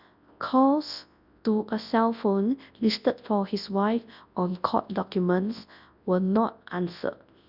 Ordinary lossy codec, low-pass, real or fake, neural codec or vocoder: none; 5.4 kHz; fake; codec, 24 kHz, 0.9 kbps, WavTokenizer, large speech release